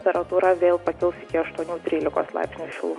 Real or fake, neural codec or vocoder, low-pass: real; none; 10.8 kHz